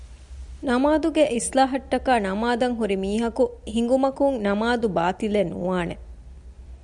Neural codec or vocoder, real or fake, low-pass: none; real; 10.8 kHz